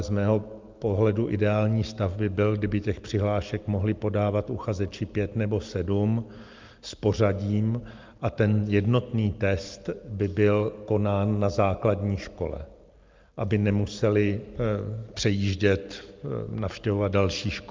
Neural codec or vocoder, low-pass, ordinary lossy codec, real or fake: none; 7.2 kHz; Opus, 24 kbps; real